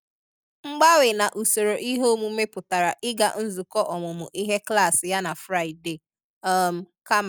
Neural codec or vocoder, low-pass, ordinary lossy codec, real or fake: none; none; none; real